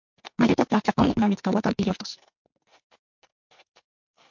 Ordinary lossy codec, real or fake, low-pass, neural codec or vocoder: MP3, 48 kbps; fake; 7.2 kHz; codec, 44.1 kHz, 2.6 kbps, SNAC